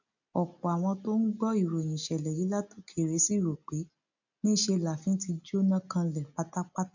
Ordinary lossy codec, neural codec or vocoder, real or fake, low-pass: none; none; real; 7.2 kHz